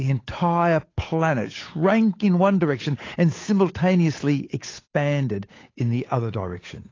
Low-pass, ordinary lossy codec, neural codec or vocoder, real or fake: 7.2 kHz; AAC, 32 kbps; codec, 16 kHz, 8 kbps, FunCodec, trained on Chinese and English, 25 frames a second; fake